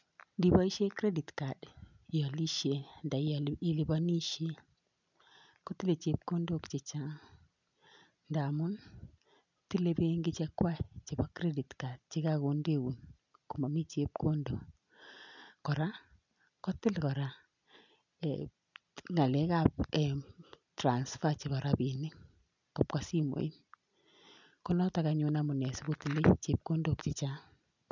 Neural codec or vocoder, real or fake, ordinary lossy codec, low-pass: none; real; none; 7.2 kHz